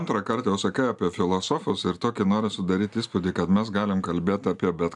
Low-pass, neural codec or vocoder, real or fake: 10.8 kHz; none; real